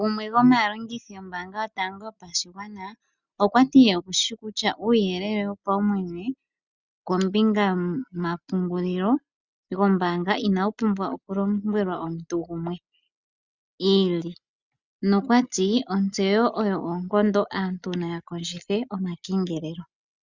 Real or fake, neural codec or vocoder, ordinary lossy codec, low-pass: real; none; Opus, 64 kbps; 7.2 kHz